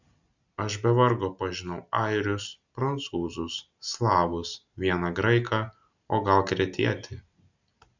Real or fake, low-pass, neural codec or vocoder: real; 7.2 kHz; none